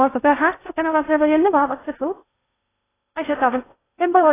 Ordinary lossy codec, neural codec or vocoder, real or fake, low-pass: AAC, 16 kbps; codec, 16 kHz in and 24 kHz out, 0.6 kbps, FocalCodec, streaming, 2048 codes; fake; 3.6 kHz